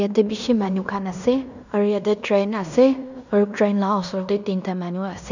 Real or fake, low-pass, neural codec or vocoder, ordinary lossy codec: fake; 7.2 kHz; codec, 16 kHz in and 24 kHz out, 0.9 kbps, LongCat-Audio-Codec, fine tuned four codebook decoder; none